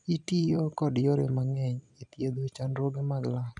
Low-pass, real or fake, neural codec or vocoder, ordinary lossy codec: 10.8 kHz; fake; vocoder, 44.1 kHz, 128 mel bands every 256 samples, BigVGAN v2; none